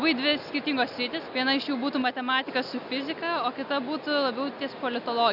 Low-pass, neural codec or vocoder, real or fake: 5.4 kHz; none; real